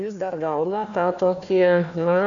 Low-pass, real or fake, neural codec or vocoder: 7.2 kHz; fake; codec, 16 kHz, 1 kbps, FunCodec, trained on Chinese and English, 50 frames a second